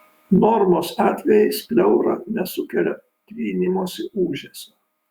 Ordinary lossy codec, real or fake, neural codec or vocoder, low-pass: Opus, 64 kbps; fake; autoencoder, 48 kHz, 128 numbers a frame, DAC-VAE, trained on Japanese speech; 19.8 kHz